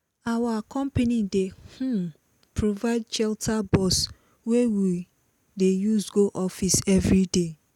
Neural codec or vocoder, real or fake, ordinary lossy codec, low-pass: none; real; none; 19.8 kHz